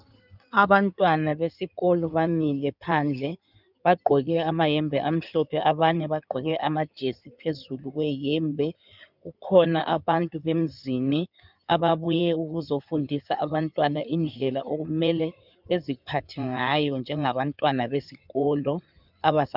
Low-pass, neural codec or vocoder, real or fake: 5.4 kHz; codec, 16 kHz in and 24 kHz out, 2.2 kbps, FireRedTTS-2 codec; fake